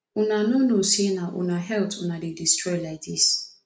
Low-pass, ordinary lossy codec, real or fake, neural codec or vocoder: none; none; real; none